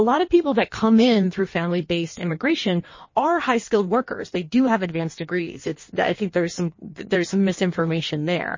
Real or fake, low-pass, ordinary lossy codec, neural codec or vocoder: fake; 7.2 kHz; MP3, 32 kbps; codec, 16 kHz in and 24 kHz out, 1.1 kbps, FireRedTTS-2 codec